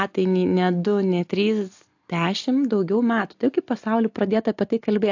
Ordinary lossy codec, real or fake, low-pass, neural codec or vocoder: AAC, 48 kbps; real; 7.2 kHz; none